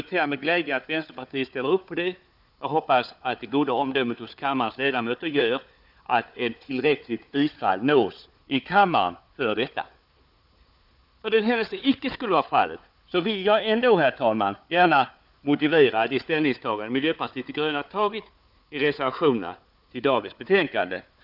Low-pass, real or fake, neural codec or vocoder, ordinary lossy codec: 5.4 kHz; fake; codec, 16 kHz, 4 kbps, FunCodec, trained on Chinese and English, 50 frames a second; none